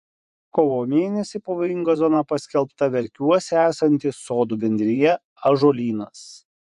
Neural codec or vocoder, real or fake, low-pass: vocoder, 24 kHz, 100 mel bands, Vocos; fake; 10.8 kHz